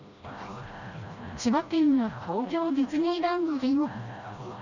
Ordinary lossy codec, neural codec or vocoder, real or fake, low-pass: none; codec, 16 kHz, 1 kbps, FreqCodec, smaller model; fake; 7.2 kHz